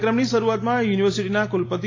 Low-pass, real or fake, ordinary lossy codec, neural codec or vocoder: 7.2 kHz; real; AAC, 32 kbps; none